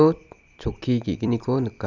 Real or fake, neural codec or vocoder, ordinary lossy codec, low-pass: fake; vocoder, 44.1 kHz, 128 mel bands every 256 samples, BigVGAN v2; none; 7.2 kHz